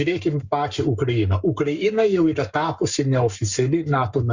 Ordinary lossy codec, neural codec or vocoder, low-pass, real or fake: Opus, 64 kbps; codec, 44.1 kHz, 7.8 kbps, Pupu-Codec; 7.2 kHz; fake